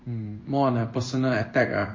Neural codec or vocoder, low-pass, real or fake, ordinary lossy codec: codec, 16 kHz in and 24 kHz out, 1 kbps, XY-Tokenizer; 7.2 kHz; fake; MP3, 32 kbps